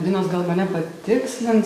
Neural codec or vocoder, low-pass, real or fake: codec, 44.1 kHz, 7.8 kbps, DAC; 14.4 kHz; fake